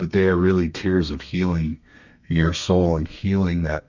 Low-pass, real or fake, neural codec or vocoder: 7.2 kHz; fake; codec, 32 kHz, 1.9 kbps, SNAC